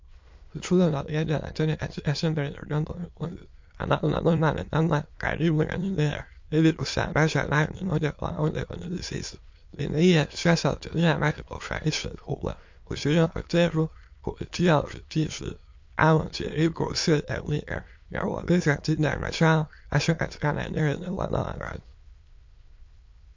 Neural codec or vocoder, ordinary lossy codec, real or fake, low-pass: autoencoder, 22.05 kHz, a latent of 192 numbers a frame, VITS, trained on many speakers; MP3, 48 kbps; fake; 7.2 kHz